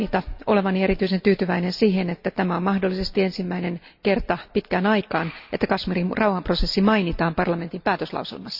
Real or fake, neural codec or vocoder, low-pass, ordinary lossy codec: real; none; 5.4 kHz; Opus, 64 kbps